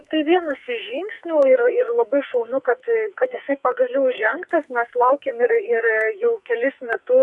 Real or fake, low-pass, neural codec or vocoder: fake; 10.8 kHz; codec, 44.1 kHz, 2.6 kbps, SNAC